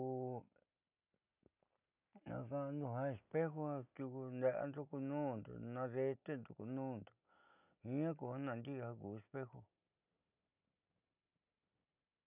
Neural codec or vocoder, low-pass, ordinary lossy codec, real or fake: none; 3.6 kHz; none; real